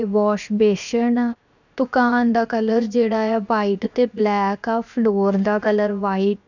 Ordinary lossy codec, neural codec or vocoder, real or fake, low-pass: none; codec, 16 kHz, 0.7 kbps, FocalCodec; fake; 7.2 kHz